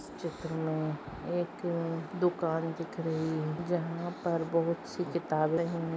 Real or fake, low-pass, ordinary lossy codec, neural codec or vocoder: real; none; none; none